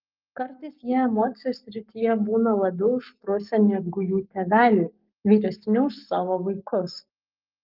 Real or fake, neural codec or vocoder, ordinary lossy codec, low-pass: fake; codec, 44.1 kHz, 7.8 kbps, Pupu-Codec; Opus, 24 kbps; 5.4 kHz